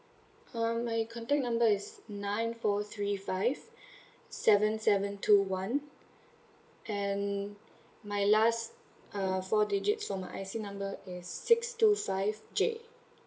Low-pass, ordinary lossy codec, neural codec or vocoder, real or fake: none; none; none; real